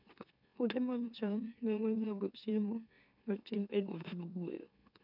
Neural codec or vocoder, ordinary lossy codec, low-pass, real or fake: autoencoder, 44.1 kHz, a latent of 192 numbers a frame, MeloTTS; none; 5.4 kHz; fake